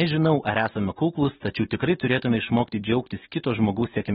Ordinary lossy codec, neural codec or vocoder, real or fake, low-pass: AAC, 16 kbps; none; real; 19.8 kHz